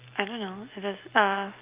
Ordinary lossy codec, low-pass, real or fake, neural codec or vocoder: Opus, 32 kbps; 3.6 kHz; real; none